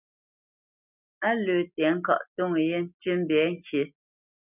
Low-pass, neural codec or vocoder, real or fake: 3.6 kHz; none; real